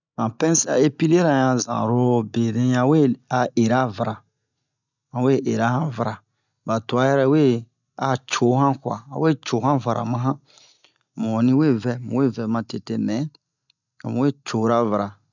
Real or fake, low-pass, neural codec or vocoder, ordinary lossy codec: real; 7.2 kHz; none; none